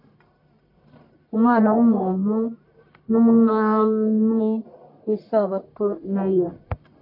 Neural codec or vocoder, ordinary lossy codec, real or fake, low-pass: codec, 44.1 kHz, 1.7 kbps, Pupu-Codec; AAC, 48 kbps; fake; 5.4 kHz